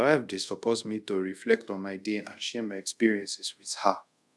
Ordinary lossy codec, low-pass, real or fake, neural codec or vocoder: none; none; fake; codec, 24 kHz, 0.5 kbps, DualCodec